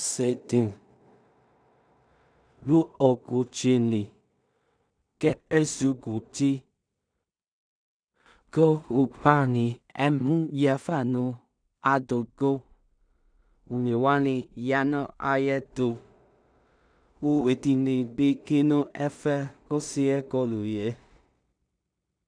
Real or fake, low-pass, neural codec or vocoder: fake; 9.9 kHz; codec, 16 kHz in and 24 kHz out, 0.4 kbps, LongCat-Audio-Codec, two codebook decoder